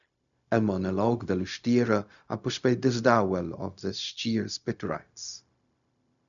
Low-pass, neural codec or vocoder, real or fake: 7.2 kHz; codec, 16 kHz, 0.4 kbps, LongCat-Audio-Codec; fake